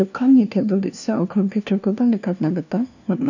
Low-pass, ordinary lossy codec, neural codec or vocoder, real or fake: 7.2 kHz; none; codec, 16 kHz, 1 kbps, FunCodec, trained on LibriTTS, 50 frames a second; fake